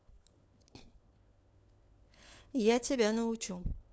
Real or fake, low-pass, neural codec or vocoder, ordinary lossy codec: fake; none; codec, 16 kHz, 4 kbps, FunCodec, trained on LibriTTS, 50 frames a second; none